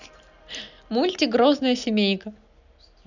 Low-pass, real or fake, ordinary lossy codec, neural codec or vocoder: 7.2 kHz; real; none; none